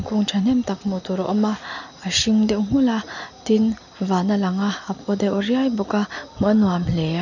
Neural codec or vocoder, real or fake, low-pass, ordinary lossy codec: none; real; 7.2 kHz; none